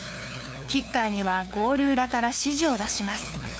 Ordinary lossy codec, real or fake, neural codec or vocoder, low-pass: none; fake; codec, 16 kHz, 2 kbps, FunCodec, trained on LibriTTS, 25 frames a second; none